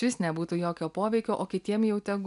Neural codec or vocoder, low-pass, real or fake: none; 10.8 kHz; real